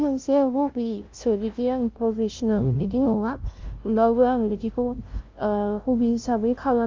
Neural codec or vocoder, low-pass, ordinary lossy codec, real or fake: codec, 16 kHz, 0.5 kbps, FunCodec, trained on LibriTTS, 25 frames a second; 7.2 kHz; Opus, 24 kbps; fake